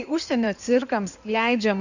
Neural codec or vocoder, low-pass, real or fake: codec, 16 kHz in and 24 kHz out, 2.2 kbps, FireRedTTS-2 codec; 7.2 kHz; fake